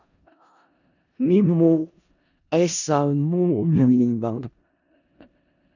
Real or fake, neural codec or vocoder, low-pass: fake; codec, 16 kHz in and 24 kHz out, 0.4 kbps, LongCat-Audio-Codec, four codebook decoder; 7.2 kHz